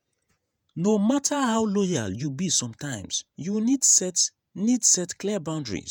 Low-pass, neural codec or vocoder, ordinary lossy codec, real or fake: none; vocoder, 48 kHz, 128 mel bands, Vocos; none; fake